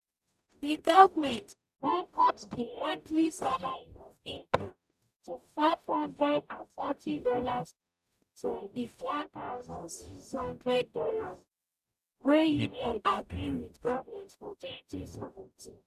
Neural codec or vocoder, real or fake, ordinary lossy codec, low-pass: codec, 44.1 kHz, 0.9 kbps, DAC; fake; none; 14.4 kHz